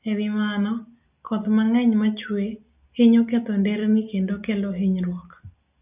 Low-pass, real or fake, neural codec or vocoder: 3.6 kHz; real; none